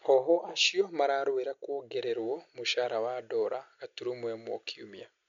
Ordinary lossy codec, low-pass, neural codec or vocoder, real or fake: MP3, 64 kbps; 7.2 kHz; none; real